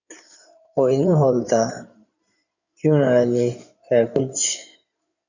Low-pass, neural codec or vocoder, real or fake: 7.2 kHz; codec, 16 kHz in and 24 kHz out, 2.2 kbps, FireRedTTS-2 codec; fake